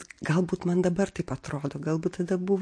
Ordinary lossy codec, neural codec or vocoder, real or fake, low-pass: MP3, 48 kbps; none; real; 9.9 kHz